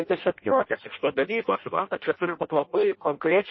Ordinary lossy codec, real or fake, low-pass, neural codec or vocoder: MP3, 24 kbps; fake; 7.2 kHz; codec, 16 kHz in and 24 kHz out, 0.6 kbps, FireRedTTS-2 codec